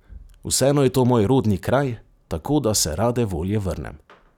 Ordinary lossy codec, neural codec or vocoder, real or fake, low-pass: none; none; real; 19.8 kHz